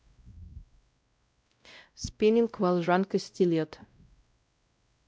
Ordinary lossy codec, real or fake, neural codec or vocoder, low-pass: none; fake; codec, 16 kHz, 0.5 kbps, X-Codec, WavLM features, trained on Multilingual LibriSpeech; none